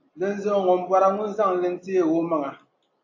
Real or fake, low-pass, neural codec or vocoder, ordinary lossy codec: real; 7.2 kHz; none; AAC, 48 kbps